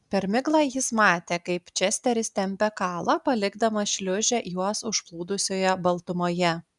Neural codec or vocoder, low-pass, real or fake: none; 10.8 kHz; real